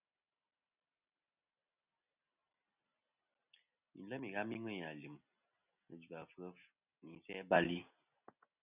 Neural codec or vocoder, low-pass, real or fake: none; 3.6 kHz; real